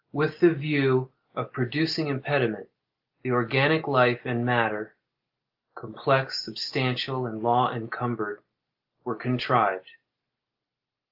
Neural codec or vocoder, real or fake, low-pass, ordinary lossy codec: none; real; 5.4 kHz; Opus, 32 kbps